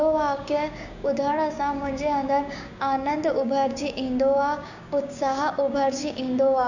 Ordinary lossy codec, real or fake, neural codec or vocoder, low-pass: none; fake; codec, 16 kHz, 6 kbps, DAC; 7.2 kHz